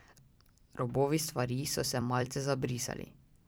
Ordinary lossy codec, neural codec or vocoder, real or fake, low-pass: none; vocoder, 44.1 kHz, 128 mel bands every 256 samples, BigVGAN v2; fake; none